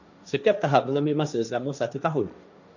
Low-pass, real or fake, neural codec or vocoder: 7.2 kHz; fake; codec, 16 kHz, 1.1 kbps, Voila-Tokenizer